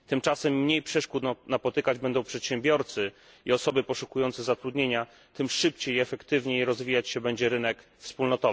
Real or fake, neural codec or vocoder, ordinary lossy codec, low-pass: real; none; none; none